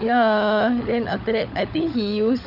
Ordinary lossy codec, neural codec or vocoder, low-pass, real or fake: none; codec, 16 kHz, 16 kbps, FunCodec, trained on LibriTTS, 50 frames a second; 5.4 kHz; fake